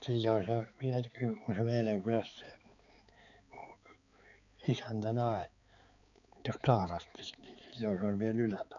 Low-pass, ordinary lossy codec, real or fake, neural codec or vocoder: 7.2 kHz; none; fake; codec, 16 kHz, 4 kbps, X-Codec, HuBERT features, trained on balanced general audio